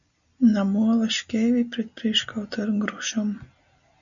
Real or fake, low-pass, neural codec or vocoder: real; 7.2 kHz; none